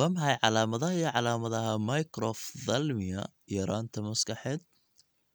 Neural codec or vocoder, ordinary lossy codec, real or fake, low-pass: none; none; real; none